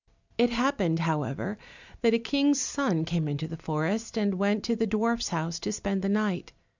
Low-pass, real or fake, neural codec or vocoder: 7.2 kHz; real; none